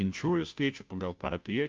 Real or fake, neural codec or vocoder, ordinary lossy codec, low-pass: fake; codec, 16 kHz, 0.5 kbps, FunCodec, trained on Chinese and English, 25 frames a second; Opus, 24 kbps; 7.2 kHz